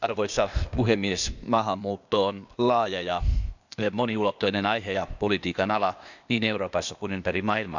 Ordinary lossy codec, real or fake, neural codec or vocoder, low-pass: none; fake; codec, 16 kHz, 0.8 kbps, ZipCodec; 7.2 kHz